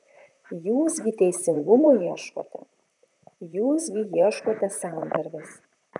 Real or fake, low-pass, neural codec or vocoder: fake; 10.8 kHz; vocoder, 44.1 kHz, 128 mel bands, Pupu-Vocoder